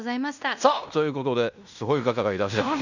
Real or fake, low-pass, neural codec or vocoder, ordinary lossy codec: fake; 7.2 kHz; codec, 16 kHz in and 24 kHz out, 0.9 kbps, LongCat-Audio-Codec, fine tuned four codebook decoder; none